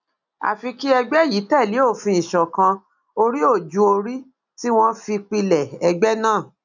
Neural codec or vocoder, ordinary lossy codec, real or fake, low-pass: none; none; real; 7.2 kHz